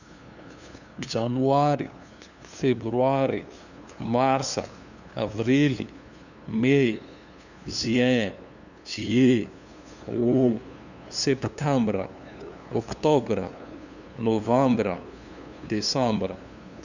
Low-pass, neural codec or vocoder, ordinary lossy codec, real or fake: 7.2 kHz; codec, 16 kHz, 2 kbps, FunCodec, trained on LibriTTS, 25 frames a second; none; fake